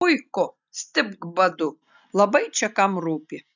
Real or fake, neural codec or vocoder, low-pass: real; none; 7.2 kHz